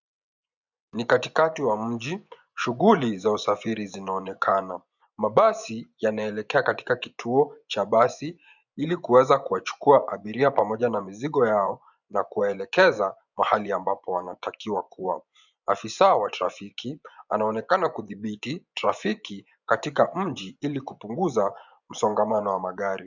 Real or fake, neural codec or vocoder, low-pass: fake; vocoder, 24 kHz, 100 mel bands, Vocos; 7.2 kHz